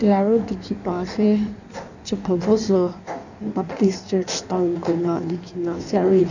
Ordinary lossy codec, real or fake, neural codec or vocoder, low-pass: none; fake; codec, 16 kHz in and 24 kHz out, 1.1 kbps, FireRedTTS-2 codec; 7.2 kHz